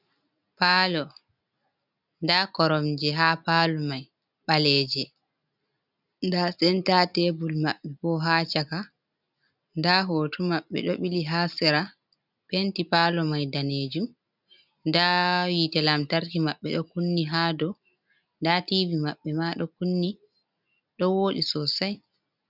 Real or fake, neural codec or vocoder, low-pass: real; none; 5.4 kHz